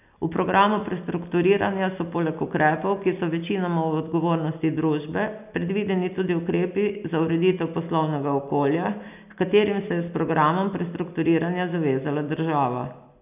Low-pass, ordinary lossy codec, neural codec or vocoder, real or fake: 3.6 kHz; none; none; real